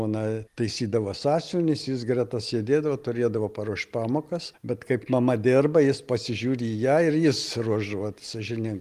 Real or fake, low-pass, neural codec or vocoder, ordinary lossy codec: real; 14.4 kHz; none; Opus, 24 kbps